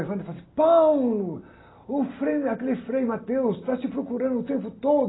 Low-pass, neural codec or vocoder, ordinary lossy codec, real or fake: 7.2 kHz; none; AAC, 16 kbps; real